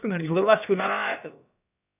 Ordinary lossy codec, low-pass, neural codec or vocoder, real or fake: none; 3.6 kHz; codec, 16 kHz, about 1 kbps, DyCAST, with the encoder's durations; fake